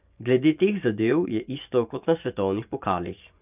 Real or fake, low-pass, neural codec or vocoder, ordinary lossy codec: fake; 3.6 kHz; vocoder, 24 kHz, 100 mel bands, Vocos; none